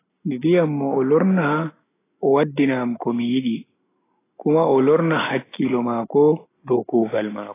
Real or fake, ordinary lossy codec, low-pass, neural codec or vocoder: real; AAC, 16 kbps; 3.6 kHz; none